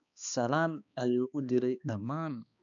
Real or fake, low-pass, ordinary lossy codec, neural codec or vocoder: fake; 7.2 kHz; none; codec, 16 kHz, 2 kbps, X-Codec, HuBERT features, trained on balanced general audio